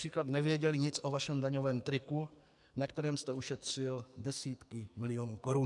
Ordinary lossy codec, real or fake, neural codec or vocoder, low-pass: MP3, 96 kbps; fake; codec, 44.1 kHz, 2.6 kbps, SNAC; 10.8 kHz